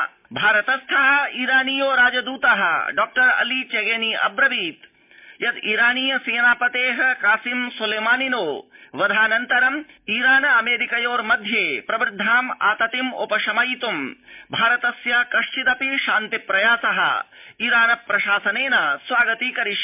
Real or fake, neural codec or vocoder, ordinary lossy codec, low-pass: real; none; MP3, 32 kbps; 3.6 kHz